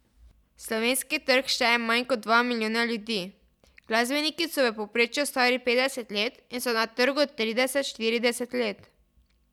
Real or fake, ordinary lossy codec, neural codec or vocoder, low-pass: real; none; none; 19.8 kHz